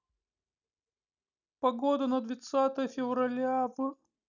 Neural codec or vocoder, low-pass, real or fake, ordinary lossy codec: none; 7.2 kHz; real; none